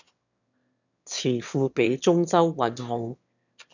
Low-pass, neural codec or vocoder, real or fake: 7.2 kHz; autoencoder, 22.05 kHz, a latent of 192 numbers a frame, VITS, trained on one speaker; fake